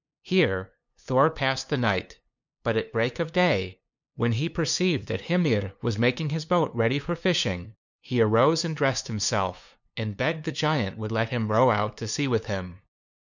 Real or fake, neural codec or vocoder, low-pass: fake; codec, 16 kHz, 2 kbps, FunCodec, trained on LibriTTS, 25 frames a second; 7.2 kHz